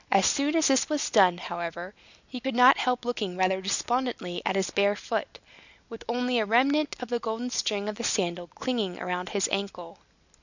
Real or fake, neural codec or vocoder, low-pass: real; none; 7.2 kHz